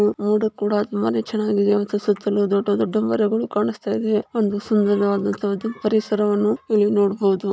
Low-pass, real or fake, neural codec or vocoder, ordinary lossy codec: none; real; none; none